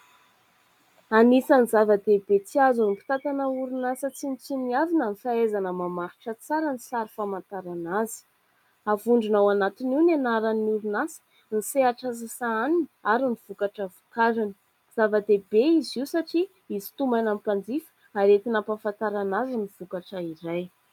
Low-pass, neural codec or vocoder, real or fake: 19.8 kHz; none; real